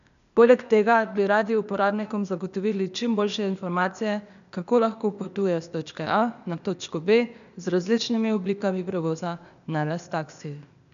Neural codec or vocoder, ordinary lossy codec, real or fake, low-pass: codec, 16 kHz, 0.8 kbps, ZipCodec; none; fake; 7.2 kHz